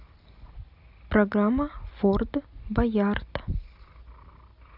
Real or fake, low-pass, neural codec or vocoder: real; 5.4 kHz; none